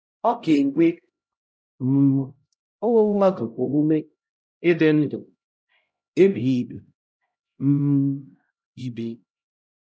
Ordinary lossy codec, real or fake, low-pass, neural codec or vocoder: none; fake; none; codec, 16 kHz, 0.5 kbps, X-Codec, HuBERT features, trained on LibriSpeech